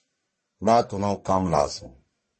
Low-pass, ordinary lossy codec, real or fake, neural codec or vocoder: 10.8 kHz; MP3, 32 kbps; fake; codec, 44.1 kHz, 1.7 kbps, Pupu-Codec